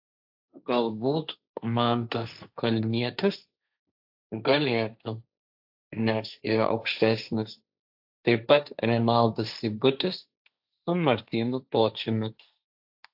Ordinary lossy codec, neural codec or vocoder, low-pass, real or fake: AAC, 48 kbps; codec, 16 kHz, 1.1 kbps, Voila-Tokenizer; 5.4 kHz; fake